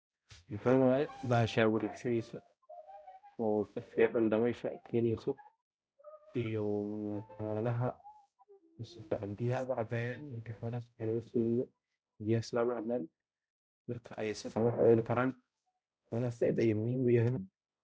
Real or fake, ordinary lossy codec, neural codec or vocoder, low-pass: fake; none; codec, 16 kHz, 0.5 kbps, X-Codec, HuBERT features, trained on balanced general audio; none